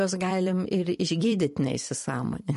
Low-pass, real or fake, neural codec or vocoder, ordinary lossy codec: 14.4 kHz; fake; vocoder, 44.1 kHz, 128 mel bands every 256 samples, BigVGAN v2; MP3, 48 kbps